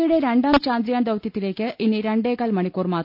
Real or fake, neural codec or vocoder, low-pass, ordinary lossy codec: real; none; 5.4 kHz; none